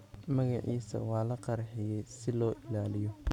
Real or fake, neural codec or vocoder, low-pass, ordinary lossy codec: real; none; 19.8 kHz; none